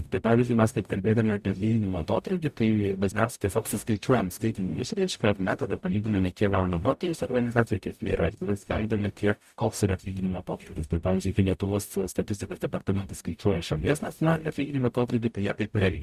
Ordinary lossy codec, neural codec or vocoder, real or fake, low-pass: Opus, 64 kbps; codec, 44.1 kHz, 0.9 kbps, DAC; fake; 14.4 kHz